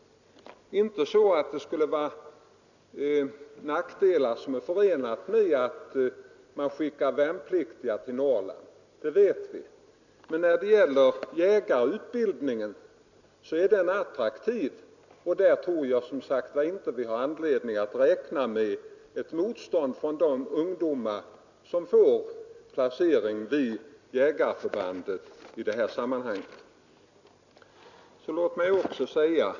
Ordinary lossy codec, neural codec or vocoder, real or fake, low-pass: none; none; real; 7.2 kHz